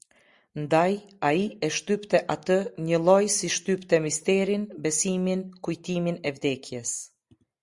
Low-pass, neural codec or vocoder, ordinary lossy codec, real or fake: 10.8 kHz; none; Opus, 64 kbps; real